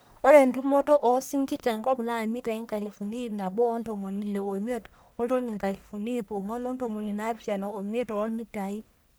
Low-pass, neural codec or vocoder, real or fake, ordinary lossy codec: none; codec, 44.1 kHz, 1.7 kbps, Pupu-Codec; fake; none